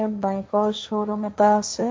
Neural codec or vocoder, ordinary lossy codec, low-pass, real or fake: codec, 16 kHz, 1.1 kbps, Voila-Tokenizer; none; none; fake